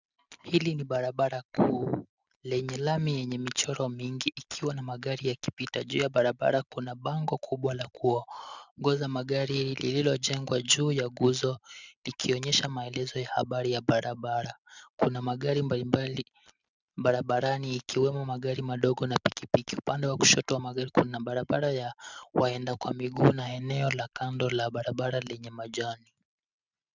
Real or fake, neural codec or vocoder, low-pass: real; none; 7.2 kHz